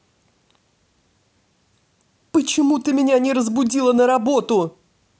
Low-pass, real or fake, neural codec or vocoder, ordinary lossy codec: none; real; none; none